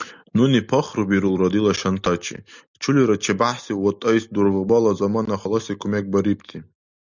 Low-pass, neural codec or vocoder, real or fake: 7.2 kHz; none; real